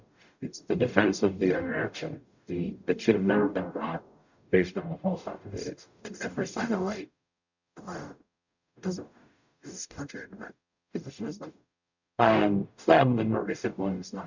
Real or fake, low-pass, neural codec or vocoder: fake; 7.2 kHz; codec, 44.1 kHz, 0.9 kbps, DAC